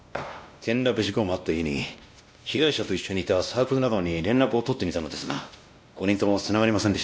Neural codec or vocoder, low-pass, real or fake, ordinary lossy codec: codec, 16 kHz, 1 kbps, X-Codec, WavLM features, trained on Multilingual LibriSpeech; none; fake; none